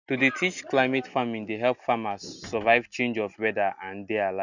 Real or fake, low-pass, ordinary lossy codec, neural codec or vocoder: real; 7.2 kHz; none; none